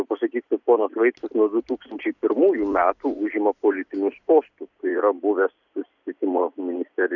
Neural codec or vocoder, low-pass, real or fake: vocoder, 44.1 kHz, 128 mel bands every 256 samples, BigVGAN v2; 7.2 kHz; fake